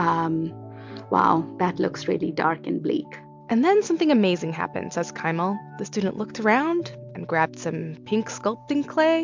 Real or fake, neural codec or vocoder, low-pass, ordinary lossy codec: real; none; 7.2 kHz; MP3, 64 kbps